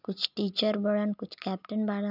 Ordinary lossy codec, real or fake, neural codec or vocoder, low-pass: none; real; none; 5.4 kHz